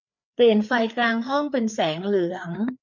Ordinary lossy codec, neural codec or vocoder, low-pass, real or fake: none; codec, 16 kHz, 4 kbps, FreqCodec, larger model; 7.2 kHz; fake